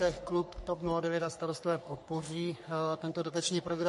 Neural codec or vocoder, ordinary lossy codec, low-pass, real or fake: codec, 44.1 kHz, 3.4 kbps, Pupu-Codec; MP3, 48 kbps; 14.4 kHz; fake